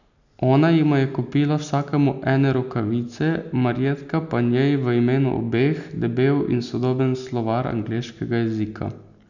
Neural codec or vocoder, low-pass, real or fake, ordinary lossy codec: none; 7.2 kHz; real; none